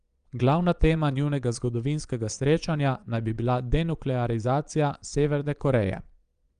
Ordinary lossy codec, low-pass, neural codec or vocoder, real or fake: Opus, 32 kbps; 9.9 kHz; vocoder, 22.05 kHz, 80 mel bands, Vocos; fake